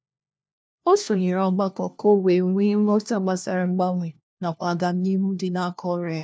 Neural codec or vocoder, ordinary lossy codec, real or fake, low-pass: codec, 16 kHz, 1 kbps, FunCodec, trained on LibriTTS, 50 frames a second; none; fake; none